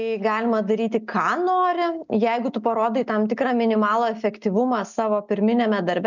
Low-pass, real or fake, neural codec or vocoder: 7.2 kHz; real; none